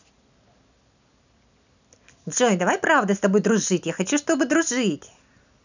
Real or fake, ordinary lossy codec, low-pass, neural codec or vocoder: real; none; 7.2 kHz; none